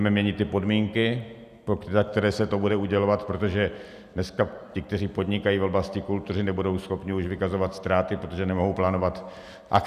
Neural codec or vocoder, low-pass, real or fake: none; 14.4 kHz; real